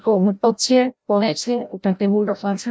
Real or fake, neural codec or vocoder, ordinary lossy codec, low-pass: fake; codec, 16 kHz, 0.5 kbps, FreqCodec, larger model; none; none